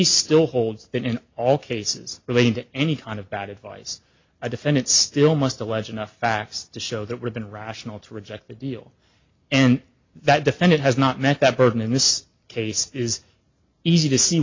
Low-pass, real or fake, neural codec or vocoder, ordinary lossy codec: 7.2 kHz; real; none; MP3, 48 kbps